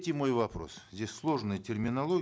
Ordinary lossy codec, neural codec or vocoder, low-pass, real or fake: none; none; none; real